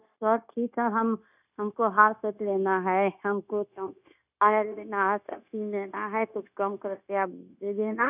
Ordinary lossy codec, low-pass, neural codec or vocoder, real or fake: none; 3.6 kHz; codec, 16 kHz, 0.9 kbps, LongCat-Audio-Codec; fake